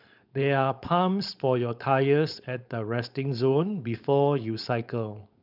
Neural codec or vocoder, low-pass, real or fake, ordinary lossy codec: codec, 16 kHz, 4.8 kbps, FACodec; 5.4 kHz; fake; none